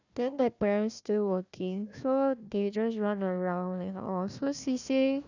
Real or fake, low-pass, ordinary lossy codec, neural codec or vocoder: fake; 7.2 kHz; none; codec, 16 kHz, 1 kbps, FunCodec, trained on Chinese and English, 50 frames a second